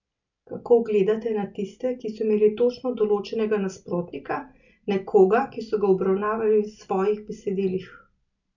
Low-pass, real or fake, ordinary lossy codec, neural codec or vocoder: 7.2 kHz; real; none; none